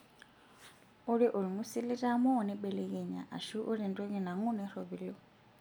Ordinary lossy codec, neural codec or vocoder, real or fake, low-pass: none; none; real; none